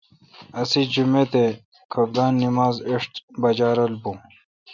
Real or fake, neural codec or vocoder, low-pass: real; none; 7.2 kHz